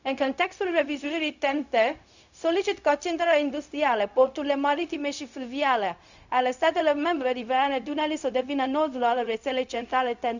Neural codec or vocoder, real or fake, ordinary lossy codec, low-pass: codec, 16 kHz, 0.4 kbps, LongCat-Audio-Codec; fake; none; 7.2 kHz